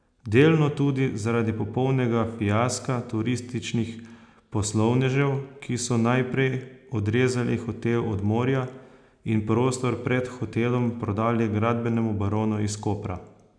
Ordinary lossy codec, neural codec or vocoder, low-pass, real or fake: none; none; 9.9 kHz; real